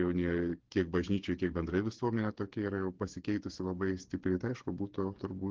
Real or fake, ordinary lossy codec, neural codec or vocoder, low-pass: fake; Opus, 16 kbps; codec, 16 kHz, 8 kbps, FreqCodec, smaller model; 7.2 kHz